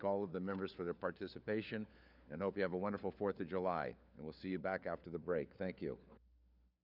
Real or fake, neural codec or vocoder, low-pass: fake; codec, 16 kHz, 4 kbps, FunCodec, trained on LibriTTS, 50 frames a second; 5.4 kHz